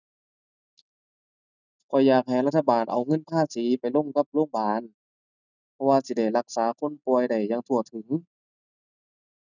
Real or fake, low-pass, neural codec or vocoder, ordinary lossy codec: real; 7.2 kHz; none; none